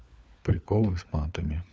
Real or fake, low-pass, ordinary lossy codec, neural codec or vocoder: fake; none; none; codec, 16 kHz, 4 kbps, FunCodec, trained on LibriTTS, 50 frames a second